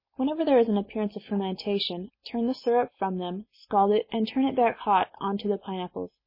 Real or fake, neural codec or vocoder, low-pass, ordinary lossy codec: real; none; 5.4 kHz; MP3, 24 kbps